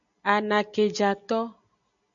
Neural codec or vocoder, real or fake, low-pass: none; real; 7.2 kHz